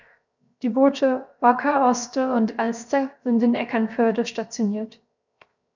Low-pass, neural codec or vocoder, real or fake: 7.2 kHz; codec, 16 kHz, 0.7 kbps, FocalCodec; fake